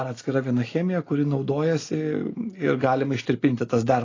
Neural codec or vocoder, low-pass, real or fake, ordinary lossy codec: none; 7.2 kHz; real; AAC, 32 kbps